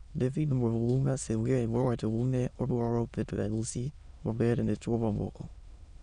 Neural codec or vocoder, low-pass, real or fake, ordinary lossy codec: autoencoder, 22.05 kHz, a latent of 192 numbers a frame, VITS, trained on many speakers; 9.9 kHz; fake; none